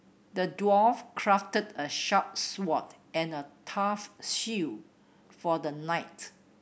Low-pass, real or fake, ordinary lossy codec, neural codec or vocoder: none; real; none; none